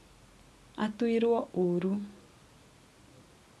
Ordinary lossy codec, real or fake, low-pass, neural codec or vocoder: none; real; none; none